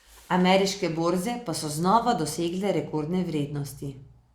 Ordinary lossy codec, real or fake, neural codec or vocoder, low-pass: Opus, 64 kbps; real; none; 19.8 kHz